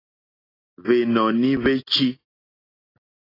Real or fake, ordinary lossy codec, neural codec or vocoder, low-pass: real; AAC, 24 kbps; none; 5.4 kHz